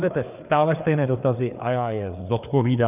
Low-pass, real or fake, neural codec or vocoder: 3.6 kHz; fake; codec, 16 kHz, 4 kbps, FreqCodec, larger model